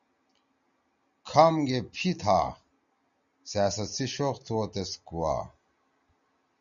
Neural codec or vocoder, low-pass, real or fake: none; 7.2 kHz; real